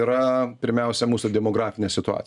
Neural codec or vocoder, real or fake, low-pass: none; real; 10.8 kHz